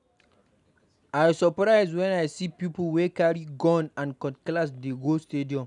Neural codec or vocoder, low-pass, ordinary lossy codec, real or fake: none; 10.8 kHz; none; real